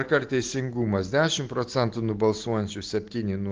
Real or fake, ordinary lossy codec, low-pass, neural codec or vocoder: real; Opus, 16 kbps; 7.2 kHz; none